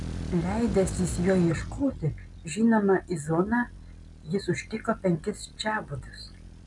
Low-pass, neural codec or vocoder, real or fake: 10.8 kHz; none; real